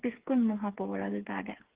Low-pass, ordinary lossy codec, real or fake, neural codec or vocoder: 3.6 kHz; Opus, 16 kbps; fake; codec, 16 kHz, 4 kbps, FreqCodec, smaller model